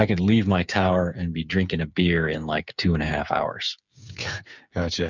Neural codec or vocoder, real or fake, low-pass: codec, 16 kHz, 8 kbps, FreqCodec, smaller model; fake; 7.2 kHz